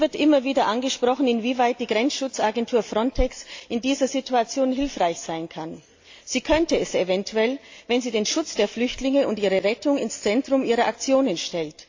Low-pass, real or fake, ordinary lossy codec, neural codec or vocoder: 7.2 kHz; real; AAC, 48 kbps; none